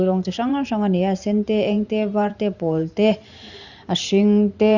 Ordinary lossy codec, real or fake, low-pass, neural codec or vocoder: Opus, 64 kbps; fake; 7.2 kHz; vocoder, 44.1 kHz, 128 mel bands every 512 samples, BigVGAN v2